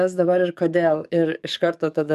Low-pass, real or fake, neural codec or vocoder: 14.4 kHz; fake; codec, 44.1 kHz, 7.8 kbps, DAC